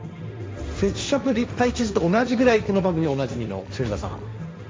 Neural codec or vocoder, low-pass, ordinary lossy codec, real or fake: codec, 16 kHz, 1.1 kbps, Voila-Tokenizer; none; none; fake